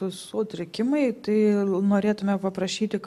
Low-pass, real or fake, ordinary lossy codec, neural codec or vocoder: 14.4 kHz; real; AAC, 96 kbps; none